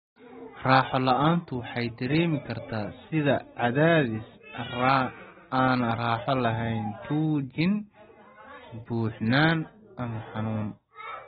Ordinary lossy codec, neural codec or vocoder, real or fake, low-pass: AAC, 16 kbps; none; real; 10.8 kHz